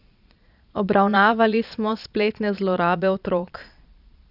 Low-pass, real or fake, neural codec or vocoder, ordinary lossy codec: 5.4 kHz; fake; vocoder, 22.05 kHz, 80 mel bands, Vocos; none